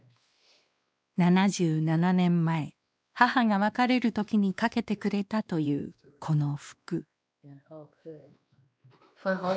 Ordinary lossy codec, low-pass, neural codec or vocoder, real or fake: none; none; codec, 16 kHz, 2 kbps, X-Codec, WavLM features, trained on Multilingual LibriSpeech; fake